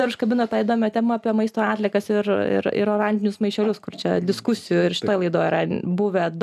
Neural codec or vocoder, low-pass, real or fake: none; 14.4 kHz; real